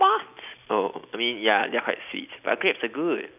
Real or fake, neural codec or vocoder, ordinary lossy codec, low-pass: real; none; none; 3.6 kHz